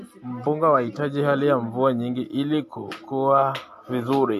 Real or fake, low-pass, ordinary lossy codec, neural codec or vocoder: real; 14.4 kHz; AAC, 64 kbps; none